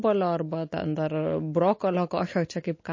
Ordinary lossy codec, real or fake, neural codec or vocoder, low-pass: MP3, 32 kbps; real; none; 7.2 kHz